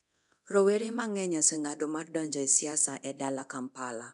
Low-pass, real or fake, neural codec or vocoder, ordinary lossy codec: 10.8 kHz; fake; codec, 24 kHz, 0.9 kbps, DualCodec; none